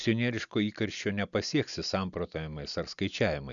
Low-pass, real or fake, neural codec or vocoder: 7.2 kHz; real; none